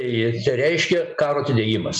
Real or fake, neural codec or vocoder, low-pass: real; none; 10.8 kHz